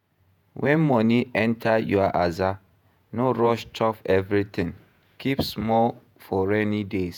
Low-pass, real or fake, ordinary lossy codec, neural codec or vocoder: 19.8 kHz; fake; none; vocoder, 48 kHz, 128 mel bands, Vocos